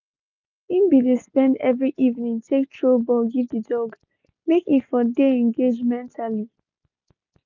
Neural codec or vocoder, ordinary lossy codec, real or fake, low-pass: none; none; real; 7.2 kHz